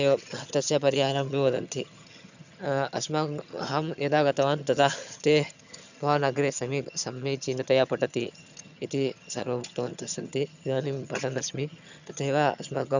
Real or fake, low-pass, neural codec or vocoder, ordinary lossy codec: fake; 7.2 kHz; vocoder, 22.05 kHz, 80 mel bands, HiFi-GAN; none